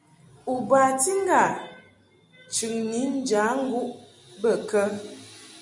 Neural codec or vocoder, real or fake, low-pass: none; real; 10.8 kHz